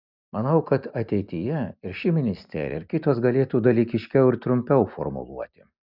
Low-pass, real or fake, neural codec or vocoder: 5.4 kHz; real; none